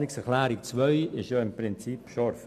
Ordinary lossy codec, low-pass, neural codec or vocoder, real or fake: none; 14.4 kHz; none; real